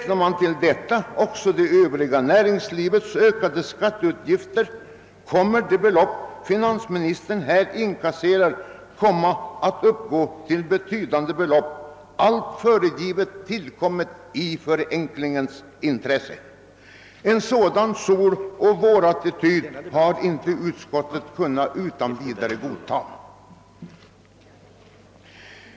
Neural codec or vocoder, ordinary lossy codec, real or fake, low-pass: none; none; real; none